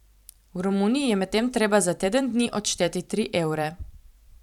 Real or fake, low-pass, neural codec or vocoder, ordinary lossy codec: fake; 19.8 kHz; vocoder, 44.1 kHz, 128 mel bands every 512 samples, BigVGAN v2; none